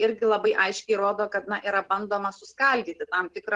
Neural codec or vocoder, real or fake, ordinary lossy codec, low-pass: none; real; Opus, 16 kbps; 10.8 kHz